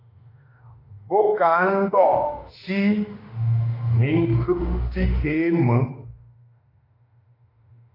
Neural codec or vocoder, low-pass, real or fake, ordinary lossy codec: autoencoder, 48 kHz, 32 numbers a frame, DAC-VAE, trained on Japanese speech; 5.4 kHz; fake; AAC, 32 kbps